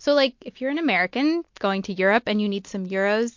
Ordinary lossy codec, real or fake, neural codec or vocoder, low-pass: MP3, 48 kbps; real; none; 7.2 kHz